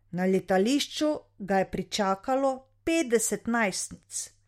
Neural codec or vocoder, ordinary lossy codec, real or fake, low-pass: none; MP3, 64 kbps; real; 19.8 kHz